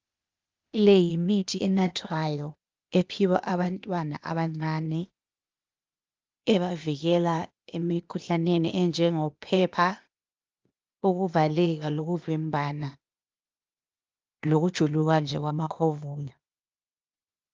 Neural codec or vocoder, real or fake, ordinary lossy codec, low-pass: codec, 16 kHz, 0.8 kbps, ZipCodec; fake; Opus, 24 kbps; 7.2 kHz